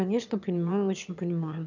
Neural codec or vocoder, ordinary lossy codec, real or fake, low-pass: autoencoder, 22.05 kHz, a latent of 192 numbers a frame, VITS, trained on one speaker; none; fake; 7.2 kHz